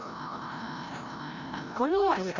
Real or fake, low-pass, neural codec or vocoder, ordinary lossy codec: fake; 7.2 kHz; codec, 16 kHz, 0.5 kbps, FreqCodec, larger model; none